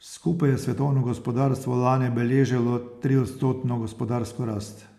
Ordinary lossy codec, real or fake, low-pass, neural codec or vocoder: none; real; 14.4 kHz; none